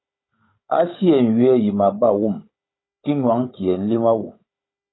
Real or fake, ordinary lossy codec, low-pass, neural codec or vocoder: fake; AAC, 16 kbps; 7.2 kHz; codec, 16 kHz, 16 kbps, FunCodec, trained on Chinese and English, 50 frames a second